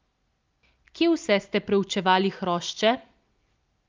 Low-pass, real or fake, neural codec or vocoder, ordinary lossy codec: 7.2 kHz; real; none; Opus, 24 kbps